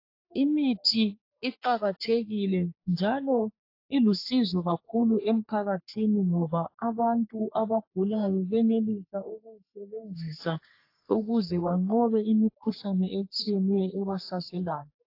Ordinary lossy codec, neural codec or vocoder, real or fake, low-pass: AAC, 32 kbps; codec, 44.1 kHz, 3.4 kbps, Pupu-Codec; fake; 5.4 kHz